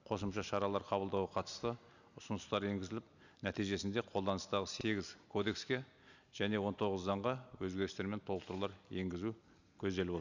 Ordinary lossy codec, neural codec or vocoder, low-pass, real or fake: none; none; 7.2 kHz; real